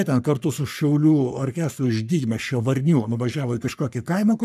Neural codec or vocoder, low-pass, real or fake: codec, 44.1 kHz, 3.4 kbps, Pupu-Codec; 14.4 kHz; fake